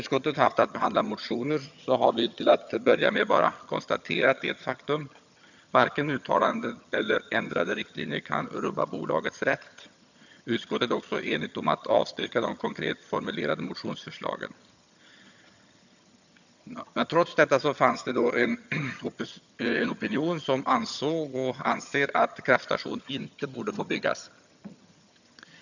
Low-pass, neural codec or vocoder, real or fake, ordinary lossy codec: 7.2 kHz; vocoder, 22.05 kHz, 80 mel bands, HiFi-GAN; fake; none